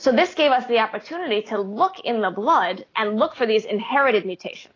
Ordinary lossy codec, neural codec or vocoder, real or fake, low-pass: AAC, 32 kbps; none; real; 7.2 kHz